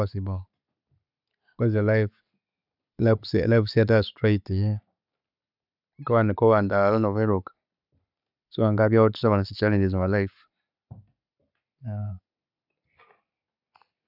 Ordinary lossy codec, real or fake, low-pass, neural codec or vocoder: none; real; 5.4 kHz; none